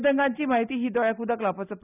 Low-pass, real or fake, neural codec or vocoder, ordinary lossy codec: 3.6 kHz; real; none; none